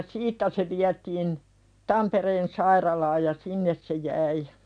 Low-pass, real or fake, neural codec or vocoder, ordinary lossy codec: 9.9 kHz; real; none; none